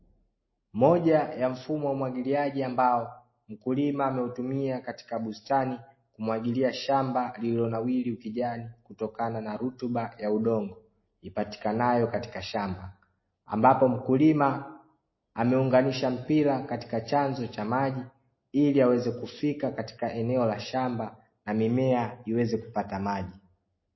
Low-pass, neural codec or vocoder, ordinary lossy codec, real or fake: 7.2 kHz; none; MP3, 24 kbps; real